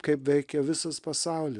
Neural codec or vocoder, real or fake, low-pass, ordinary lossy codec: none; real; 10.8 kHz; Opus, 64 kbps